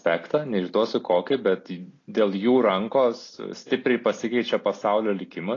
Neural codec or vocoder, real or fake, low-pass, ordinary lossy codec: none; real; 7.2 kHz; AAC, 32 kbps